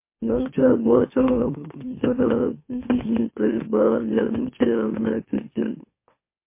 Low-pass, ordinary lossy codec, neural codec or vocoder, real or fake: 3.6 kHz; MP3, 24 kbps; autoencoder, 44.1 kHz, a latent of 192 numbers a frame, MeloTTS; fake